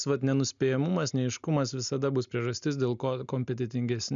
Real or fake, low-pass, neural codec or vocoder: real; 7.2 kHz; none